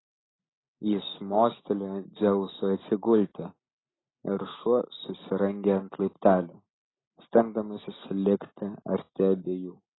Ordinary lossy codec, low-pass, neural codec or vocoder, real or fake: AAC, 16 kbps; 7.2 kHz; none; real